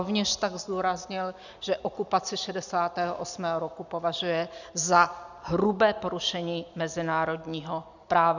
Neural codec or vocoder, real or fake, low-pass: none; real; 7.2 kHz